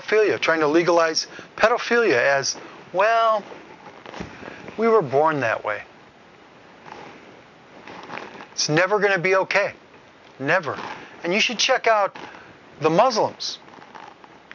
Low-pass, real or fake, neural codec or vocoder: 7.2 kHz; real; none